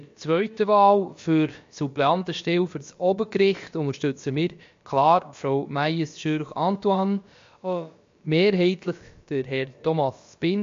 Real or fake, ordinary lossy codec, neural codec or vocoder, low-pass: fake; MP3, 48 kbps; codec, 16 kHz, about 1 kbps, DyCAST, with the encoder's durations; 7.2 kHz